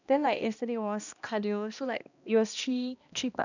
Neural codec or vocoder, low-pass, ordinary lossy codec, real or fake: codec, 16 kHz, 1 kbps, X-Codec, HuBERT features, trained on balanced general audio; 7.2 kHz; none; fake